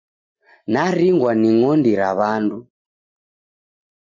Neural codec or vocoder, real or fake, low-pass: none; real; 7.2 kHz